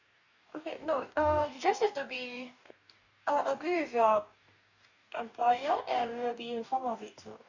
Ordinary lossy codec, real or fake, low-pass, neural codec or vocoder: none; fake; 7.2 kHz; codec, 44.1 kHz, 2.6 kbps, DAC